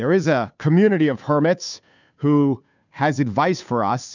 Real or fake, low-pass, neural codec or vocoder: fake; 7.2 kHz; codec, 16 kHz, 2 kbps, FunCodec, trained on Chinese and English, 25 frames a second